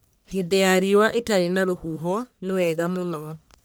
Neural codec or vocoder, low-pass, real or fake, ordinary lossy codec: codec, 44.1 kHz, 1.7 kbps, Pupu-Codec; none; fake; none